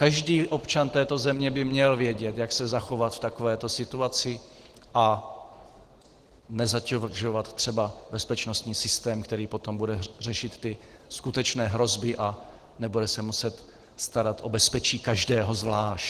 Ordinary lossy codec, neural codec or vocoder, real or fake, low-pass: Opus, 16 kbps; none; real; 14.4 kHz